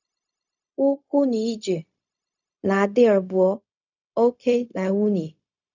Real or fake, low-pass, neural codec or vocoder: fake; 7.2 kHz; codec, 16 kHz, 0.4 kbps, LongCat-Audio-Codec